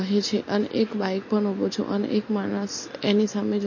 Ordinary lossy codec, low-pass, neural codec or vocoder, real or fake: MP3, 32 kbps; 7.2 kHz; none; real